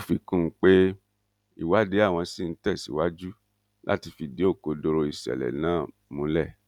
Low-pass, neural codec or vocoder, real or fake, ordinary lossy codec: 19.8 kHz; none; real; none